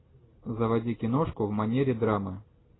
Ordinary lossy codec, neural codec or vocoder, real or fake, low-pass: AAC, 16 kbps; none; real; 7.2 kHz